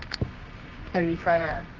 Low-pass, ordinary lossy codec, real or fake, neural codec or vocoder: 7.2 kHz; Opus, 32 kbps; fake; codec, 24 kHz, 0.9 kbps, WavTokenizer, medium music audio release